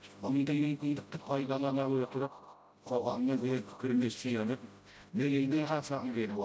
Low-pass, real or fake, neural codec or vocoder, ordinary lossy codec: none; fake; codec, 16 kHz, 0.5 kbps, FreqCodec, smaller model; none